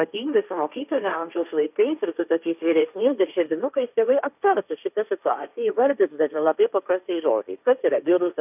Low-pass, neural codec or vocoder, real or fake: 3.6 kHz; codec, 16 kHz, 1.1 kbps, Voila-Tokenizer; fake